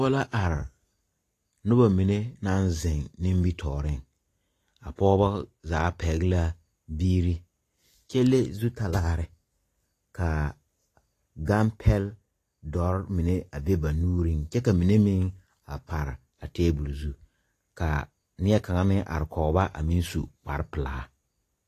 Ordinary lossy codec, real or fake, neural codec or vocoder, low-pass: AAC, 48 kbps; real; none; 14.4 kHz